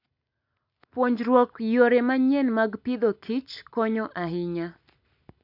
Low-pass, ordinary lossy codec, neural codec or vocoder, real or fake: 5.4 kHz; AAC, 48 kbps; none; real